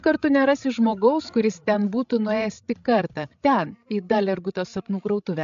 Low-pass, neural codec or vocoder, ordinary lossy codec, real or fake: 7.2 kHz; codec, 16 kHz, 16 kbps, FreqCodec, larger model; AAC, 64 kbps; fake